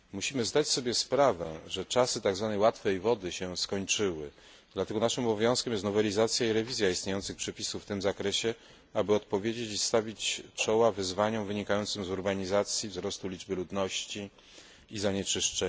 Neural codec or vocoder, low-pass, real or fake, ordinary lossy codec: none; none; real; none